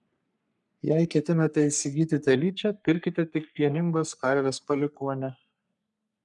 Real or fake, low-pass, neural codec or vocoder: fake; 10.8 kHz; codec, 44.1 kHz, 3.4 kbps, Pupu-Codec